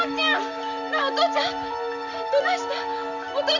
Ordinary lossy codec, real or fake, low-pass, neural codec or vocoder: none; real; 7.2 kHz; none